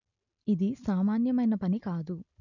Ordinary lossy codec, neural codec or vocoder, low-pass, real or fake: none; none; 7.2 kHz; real